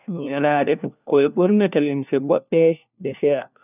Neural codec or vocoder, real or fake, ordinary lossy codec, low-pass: codec, 16 kHz, 1 kbps, FunCodec, trained on LibriTTS, 50 frames a second; fake; none; 3.6 kHz